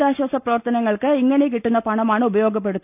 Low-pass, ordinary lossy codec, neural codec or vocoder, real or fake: 3.6 kHz; none; none; real